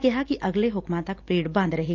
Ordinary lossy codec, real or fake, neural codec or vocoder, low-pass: Opus, 24 kbps; real; none; 7.2 kHz